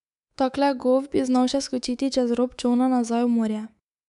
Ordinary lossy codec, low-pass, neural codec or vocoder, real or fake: none; 10.8 kHz; codec, 24 kHz, 3.1 kbps, DualCodec; fake